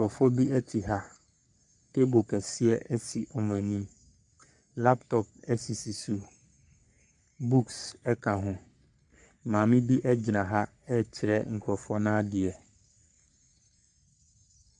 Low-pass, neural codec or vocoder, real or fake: 10.8 kHz; codec, 44.1 kHz, 3.4 kbps, Pupu-Codec; fake